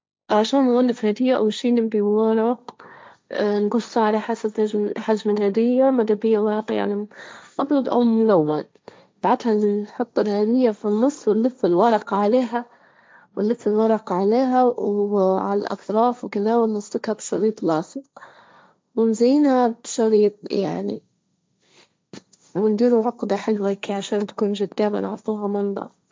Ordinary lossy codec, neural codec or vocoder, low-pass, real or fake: none; codec, 16 kHz, 1.1 kbps, Voila-Tokenizer; none; fake